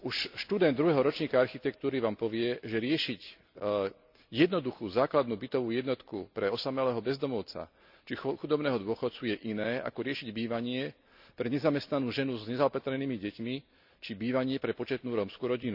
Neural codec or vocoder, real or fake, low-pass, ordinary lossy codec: none; real; 5.4 kHz; none